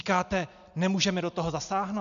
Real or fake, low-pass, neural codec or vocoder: real; 7.2 kHz; none